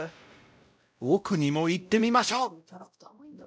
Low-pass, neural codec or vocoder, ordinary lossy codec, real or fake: none; codec, 16 kHz, 0.5 kbps, X-Codec, WavLM features, trained on Multilingual LibriSpeech; none; fake